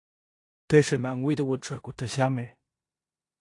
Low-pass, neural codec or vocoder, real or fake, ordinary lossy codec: 10.8 kHz; codec, 16 kHz in and 24 kHz out, 0.9 kbps, LongCat-Audio-Codec, four codebook decoder; fake; AAC, 48 kbps